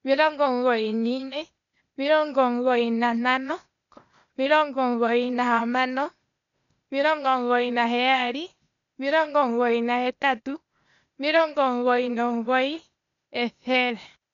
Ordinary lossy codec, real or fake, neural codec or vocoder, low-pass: none; fake; codec, 16 kHz, 0.8 kbps, ZipCodec; 7.2 kHz